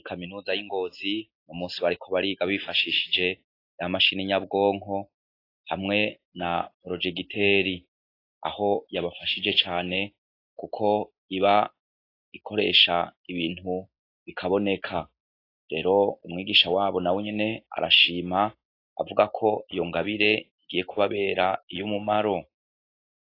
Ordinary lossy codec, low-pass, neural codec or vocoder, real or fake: AAC, 32 kbps; 5.4 kHz; none; real